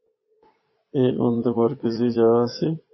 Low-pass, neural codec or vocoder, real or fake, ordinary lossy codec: 7.2 kHz; vocoder, 22.05 kHz, 80 mel bands, Vocos; fake; MP3, 24 kbps